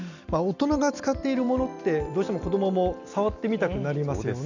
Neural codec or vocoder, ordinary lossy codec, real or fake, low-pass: none; none; real; 7.2 kHz